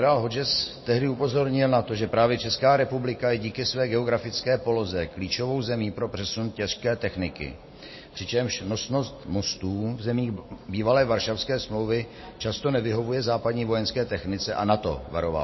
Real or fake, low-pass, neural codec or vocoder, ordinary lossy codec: real; 7.2 kHz; none; MP3, 24 kbps